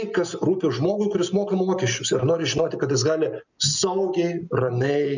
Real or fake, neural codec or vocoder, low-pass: real; none; 7.2 kHz